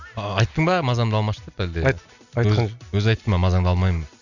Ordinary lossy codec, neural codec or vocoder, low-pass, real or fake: none; none; 7.2 kHz; real